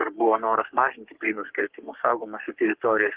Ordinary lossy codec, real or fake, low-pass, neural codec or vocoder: Opus, 16 kbps; fake; 3.6 kHz; codec, 44.1 kHz, 3.4 kbps, Pupu-Codec